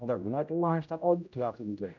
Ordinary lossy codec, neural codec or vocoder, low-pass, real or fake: none; codec, 16 kHz, 0.5 kbps, X-Codec, HuBERT features, trained on general audio; 7.2 kHz; fake